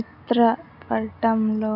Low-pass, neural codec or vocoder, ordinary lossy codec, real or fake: 5.4 kHz; none; none; real